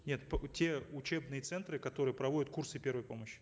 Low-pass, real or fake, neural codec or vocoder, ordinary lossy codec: none; real; none; none